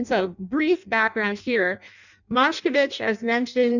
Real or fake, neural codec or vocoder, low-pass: fake; codec, 16 kHz in and 24 kHz out, 0.6 kbps, FireRedTTS-2 codec; 7.2 kHz